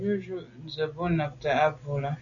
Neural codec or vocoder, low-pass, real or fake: none; 7.2 kHz; real